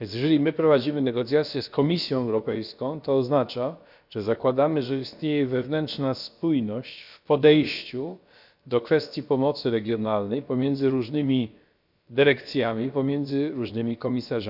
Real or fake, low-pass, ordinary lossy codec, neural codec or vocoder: fake; 5.4 kHz; none; codec, 16 kHz, about 1 kbps, DyCAST, with the encoder's durations